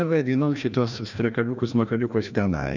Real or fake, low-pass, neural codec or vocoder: fake; 7.2 kHz; codec, 16 kHz, 1 kbps, FreqCodec, larger model